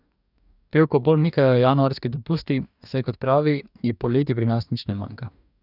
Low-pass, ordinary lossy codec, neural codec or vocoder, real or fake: 5.4 kHz; none; codec, 44.1 kHz, 2.6 kbps, DAC; fake